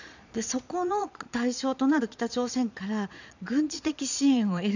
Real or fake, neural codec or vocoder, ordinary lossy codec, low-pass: fake; vocoder, 22.05 kHz, 80 mel bands, Vocos; none; 7.2 kHz